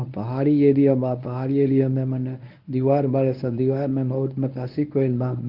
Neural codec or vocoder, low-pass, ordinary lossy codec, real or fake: codec, 24 kHz, 0.9 kbps, WavTokenizer, medium speech release version 1; 5.4 kHz; Opus, 24 kbps; fake